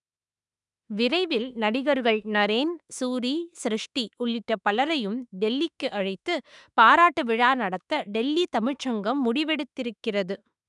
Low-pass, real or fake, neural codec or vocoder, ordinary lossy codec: 10.8 kHz; fake; autoencoder, 48 kHz, 32 numbers a frame, DAC-VAE, trained on Japanese speech; none